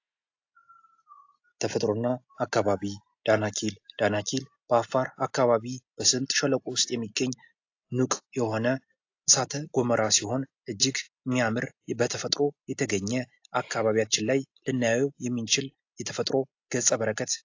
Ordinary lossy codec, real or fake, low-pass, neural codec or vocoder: AAC, 48 kbps; real; 7.2 kHz; none